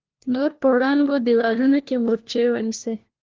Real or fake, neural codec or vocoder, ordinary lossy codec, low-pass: fake; codec, 16 kHz, 1 kbps, FunCodec, trained on LibriTTS, 50 frames a second; Opus, 16 kbps; 7.2 kHz